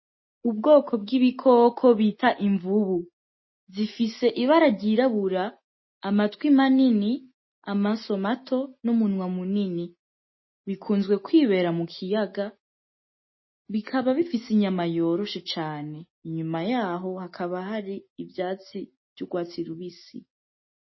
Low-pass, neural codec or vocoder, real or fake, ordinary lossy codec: 7.2 kHz; none; real; MP3, 24 kbps